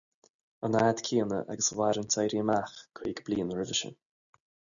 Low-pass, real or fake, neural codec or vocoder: 7.2 kHz; real; none